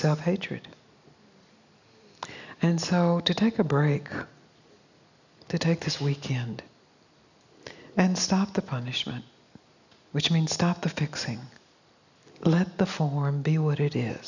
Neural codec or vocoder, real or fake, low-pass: none; real; 7.2 kHz